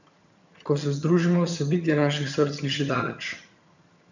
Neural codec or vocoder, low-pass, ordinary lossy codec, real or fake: vocoder, 22.05 kHz, 80 mel bands, HiFi-GAN; 7.2 kHz; none; fake